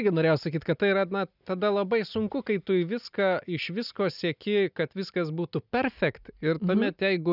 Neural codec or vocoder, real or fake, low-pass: none; real; 5.4 kHz